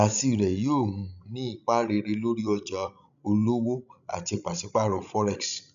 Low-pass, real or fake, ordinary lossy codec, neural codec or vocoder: 7.2 kHz; fake; none; codec, 16 kHz, 16 kbps, FreqCodec, larger model